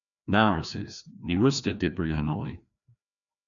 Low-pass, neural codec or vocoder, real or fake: 7.2 kHz; codec, 16 kHz, 1 kbps, FreqCodec, larger model; fake